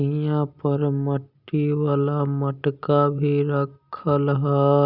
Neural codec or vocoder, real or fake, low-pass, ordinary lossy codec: none; real; 5.4 kHz; Opus, 64 kbps